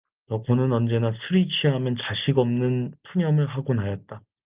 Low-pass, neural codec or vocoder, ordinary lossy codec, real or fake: 3.6 kHz; none; Opus, 32 kbps; real